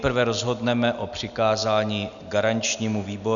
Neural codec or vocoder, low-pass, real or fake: none; 7.2 kHz; real